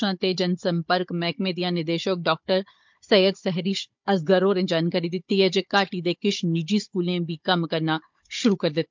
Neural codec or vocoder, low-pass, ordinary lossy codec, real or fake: codec, 16 kHz, 16 kbps, FunCodec, trained on Chinese and English, 50 frames a second; 7.2 kHz; MP3, 64 kbps; fake